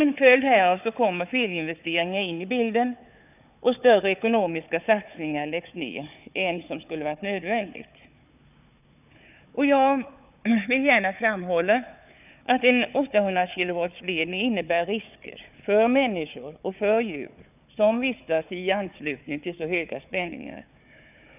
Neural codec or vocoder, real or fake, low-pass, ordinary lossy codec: codec, 16 kHz, 4 kbps, FunCodec, trained on Chinese and English, 50 frames a second; fake; 3.6 kHz; none